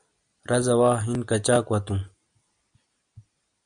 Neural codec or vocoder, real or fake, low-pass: none; real; 9.9 kHz